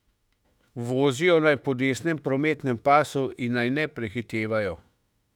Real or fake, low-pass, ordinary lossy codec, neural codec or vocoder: fake; 19.8 kHz; none; autoencoder, 48 kHz, 32 numbers a frame, DAC-VAE, trained on Japanese speech